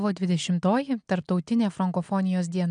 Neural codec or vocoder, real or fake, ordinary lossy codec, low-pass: none; real; MP3, 96 kbps; 9.9 kHz